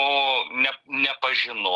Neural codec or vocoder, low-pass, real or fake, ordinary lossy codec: none; 10.8 kHz; real; Opus, 24 kbps